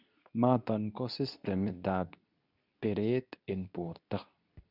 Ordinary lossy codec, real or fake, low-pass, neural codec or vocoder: none; fake; 5.4 kHz; codec, 24 kHz, 0.9 kbps, WavTokenizer, medium speech release version 2